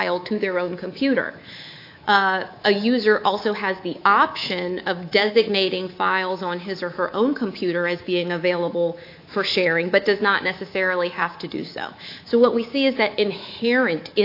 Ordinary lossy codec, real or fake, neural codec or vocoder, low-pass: AAC, 32 kbps; fake; codec, 24 kHz, 3.1 kbps, DualCodec; 5.4 kHz